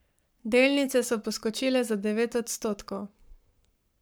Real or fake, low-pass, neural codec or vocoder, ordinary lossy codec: fake; none; codec, 44.1 kHz, 7.8 kbps, Pupu-Codec; none